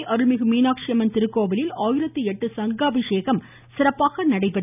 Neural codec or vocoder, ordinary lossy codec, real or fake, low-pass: none; none; real; 3.6 kHz